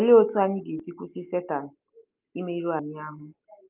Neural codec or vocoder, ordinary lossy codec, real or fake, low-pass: none; Opus, 32 kbps; real; 3.6 kHz